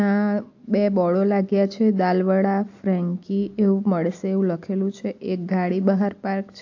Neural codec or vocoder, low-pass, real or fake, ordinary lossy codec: none; 7.2 kHz; real; AAC, 48 kbps